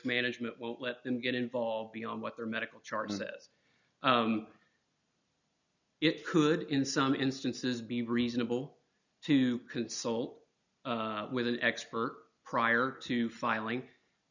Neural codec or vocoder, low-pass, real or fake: none; 7.2 kHz; real